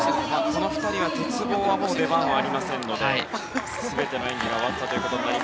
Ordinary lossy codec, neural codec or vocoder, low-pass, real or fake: none; none; none; real